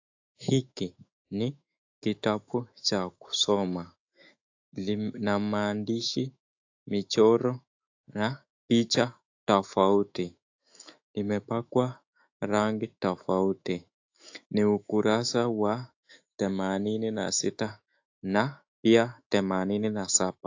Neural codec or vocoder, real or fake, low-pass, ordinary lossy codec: autoencoder, 48 kHz, 128 numbers a frame, DAC-VAE, trained on Japanese speech; fake; 7.2 kHz; AAC, 48 kbps